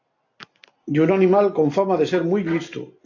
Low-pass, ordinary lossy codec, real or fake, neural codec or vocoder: 7.2 kHz; AAC, 48 kbps; real; none